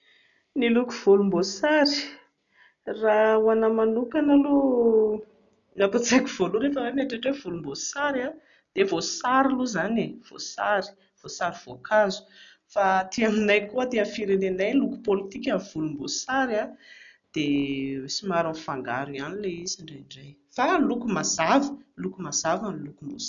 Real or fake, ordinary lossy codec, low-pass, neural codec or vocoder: real; none; 7.2 kHz; none